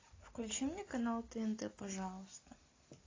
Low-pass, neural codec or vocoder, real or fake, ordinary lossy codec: 7.2 kHz; none; real; AAC, 32 kbps